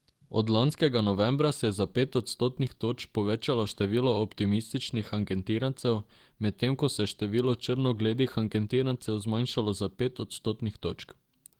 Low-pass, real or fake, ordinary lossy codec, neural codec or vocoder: 19.8 kHz; fake; Opus, 24 kbps; codec, 44.1 kHz, 7.8 kbps, DAC